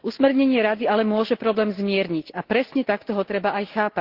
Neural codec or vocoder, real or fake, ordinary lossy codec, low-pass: none; real; Opus, 16 kbps; 5.4 kHz